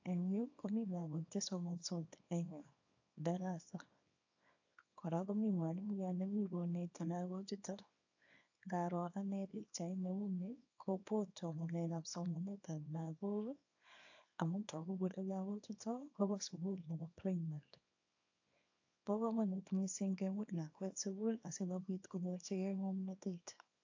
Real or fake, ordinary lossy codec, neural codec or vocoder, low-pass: fake; AAC, 48 kbps; codec, 24 kHz, 0.9 kbps, WavTokenizer, small release; 7.2 kHz